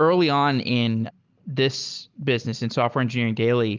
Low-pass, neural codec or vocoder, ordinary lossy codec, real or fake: 7.2 kHz; none; Opus, 24 kbps; real